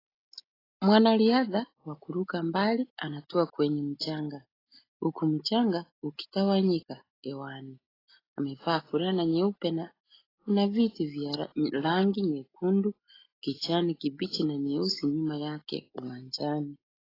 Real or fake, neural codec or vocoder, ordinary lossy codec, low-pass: real; none; AAC, 24 kbps; 5.4 kHz